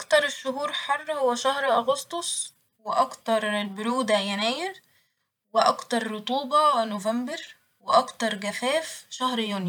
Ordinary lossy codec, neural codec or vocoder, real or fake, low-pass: none; vocoder, 44.1 kHz, 128 mel bands every 512 samples, BigVGAN v2; fake; 19.8 kHz